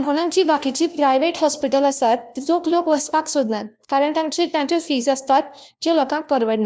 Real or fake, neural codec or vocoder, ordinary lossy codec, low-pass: fake; codec, 16 kHz, 1 kbps, FunCodec, trained on LibriTTS, 50 frames a second; none; none